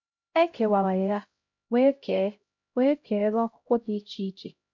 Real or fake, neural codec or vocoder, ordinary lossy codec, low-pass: fake; codec, 16 kHz, 0.5 kbps, X-Codec, HuBERT features, trained on LibriSpeech; MP3, 64 kbps; 7.2 kHz